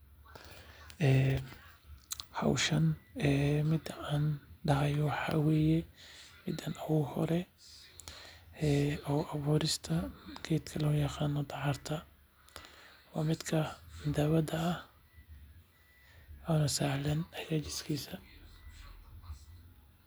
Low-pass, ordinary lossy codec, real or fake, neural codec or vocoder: none; none; real; none